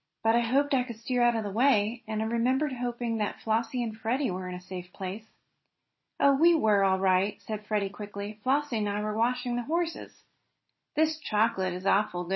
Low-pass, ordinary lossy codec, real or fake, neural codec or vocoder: 7.2 kHz; MP3, 24 kbps; real; none